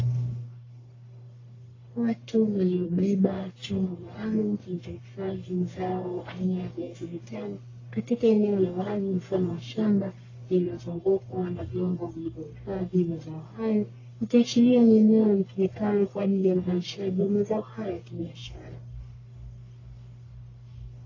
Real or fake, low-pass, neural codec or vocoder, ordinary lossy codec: fake; 7.2 kHz; codec, 44.1 kHz, 1.7 kbps, Pupu-Codec; AAC, 32 kbps